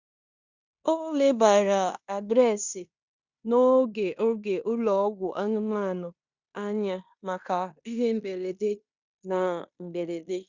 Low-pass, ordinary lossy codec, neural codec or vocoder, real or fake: 7.2 kHz; Opus, 64 kbps; codec, 16 kHz in and 24 kHz out, 0.9 kbps, LongCat-Audio-Codec, fine tuned four codebook decoder; fake